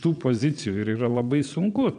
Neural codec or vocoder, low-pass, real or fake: vocoder, 22.05 kHz, 80 mel bands, Vocos; 9.9 kHz; fake